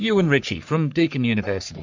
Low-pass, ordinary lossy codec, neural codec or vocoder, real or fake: 7.2 kHz; MP3, 64 kbps; codec, 44.1 kHz, 3.4 kbps, Pupu-Codec; fake